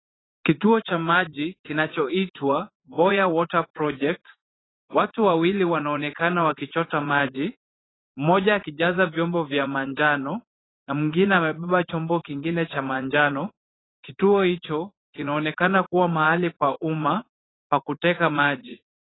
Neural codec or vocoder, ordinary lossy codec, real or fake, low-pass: vocoder, 44.1 kHz, 80 mel bands, Vocos; AAC, 16 kbps; fake; 7.2 kHz